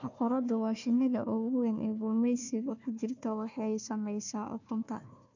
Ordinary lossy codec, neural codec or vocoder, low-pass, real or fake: none; codec, 16 kHz, 1 kbps, FunCodec, trained on Chinese and English, 50 frames a second; 7.2 kHz; fake